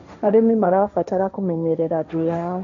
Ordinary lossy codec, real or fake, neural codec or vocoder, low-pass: none; fake; codec, 16 kHz, 1.1 kbps, Voila-Tokenizer; 7.2 kHz